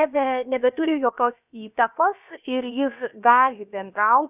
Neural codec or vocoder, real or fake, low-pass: codec, 16 kHz, about 1 kbps, DyCAST, with the encoder's durations; fake; 3.6 kHz